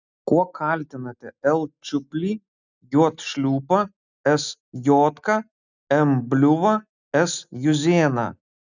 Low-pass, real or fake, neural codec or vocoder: 7.2 kHz; real; none